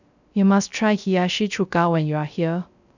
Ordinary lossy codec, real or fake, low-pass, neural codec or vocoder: none; fake; 7.2 kHz; codec, 16 kHz, 0.3 kbps, FocalCodec